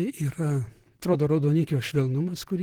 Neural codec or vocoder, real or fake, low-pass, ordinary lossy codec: vocoder, 44.1 kHz, 128 mel bands, Pupu-Vocoder; fake; 19.8 kHz; Opus, 16 kbps